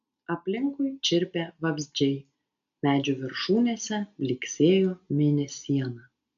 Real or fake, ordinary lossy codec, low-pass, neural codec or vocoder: real; AAC, 96 kbps; 7.2 kHz; none